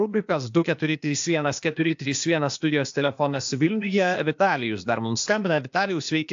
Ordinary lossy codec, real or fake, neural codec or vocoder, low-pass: AAC, 64 kbps; fake; codec, 16 kHz, 0.8 kbps, ZipCodec; 7.2 kHz